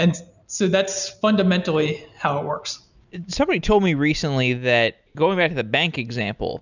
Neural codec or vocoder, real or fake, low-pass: none; real; 7.2 kHz